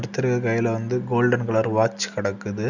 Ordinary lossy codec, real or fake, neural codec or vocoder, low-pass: none; real; none; 7.2 kHz